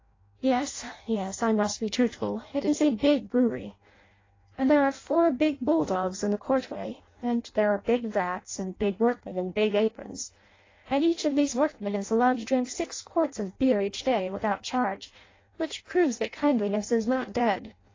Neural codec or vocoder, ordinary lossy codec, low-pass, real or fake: codec, 16 kHz in and 24 kHz out, 0.6 kbps, FireRedTTS-2 codec; AAC, 32 kbps; 7.2 kHz; fake